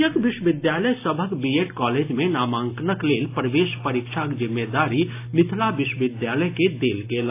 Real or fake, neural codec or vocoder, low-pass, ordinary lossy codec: real; none; 3.6 kHz; AAC, 24 kbps